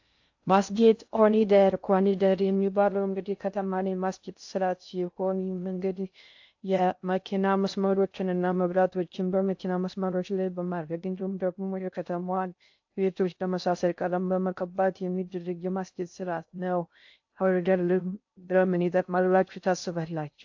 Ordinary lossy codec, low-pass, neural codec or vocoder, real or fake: AAC, 48 kbps; 7.2 kHz; codec, 16 kHz in and 24 kHz out, 0.6 kbps, FocalCodec, streaming, 2048 codes; fake